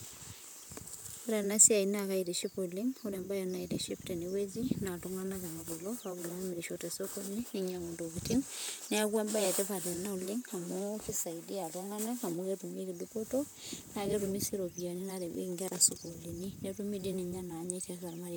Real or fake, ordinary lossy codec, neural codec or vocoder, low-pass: fake; none; vocoder, 44.1 kHz, 128 mel bands, Pupu-Vocoder; none